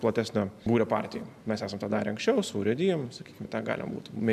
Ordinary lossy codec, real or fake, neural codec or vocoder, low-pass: AAC, 96 kbps; real; none; 14.4 kHz